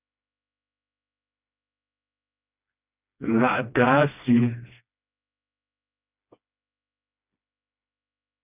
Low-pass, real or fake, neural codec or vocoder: 3.6 kHz; fake; codec, 16 kHz, 1 kbps, FreqCodec, smaller model